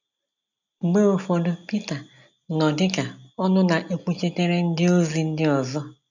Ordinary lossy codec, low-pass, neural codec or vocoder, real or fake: none; 7.2 kHz; none; real